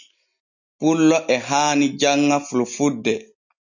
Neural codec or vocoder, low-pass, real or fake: none; 7.2 kHz; real